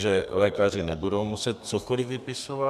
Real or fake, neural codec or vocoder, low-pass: fake; codec, 32 kHz, 1.9 kbps, SNAC; 14.4 kHz